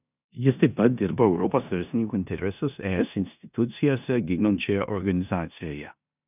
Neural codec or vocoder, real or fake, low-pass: codec, 16 kHz in and 24 kHz out, 0.9 kbps, LongCat-Audio-Codec, four codebook decoder; fake; 3.6 kHz